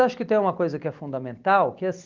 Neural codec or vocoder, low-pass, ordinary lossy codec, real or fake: none; 7.2 kHz; Opus, 32 kbps; real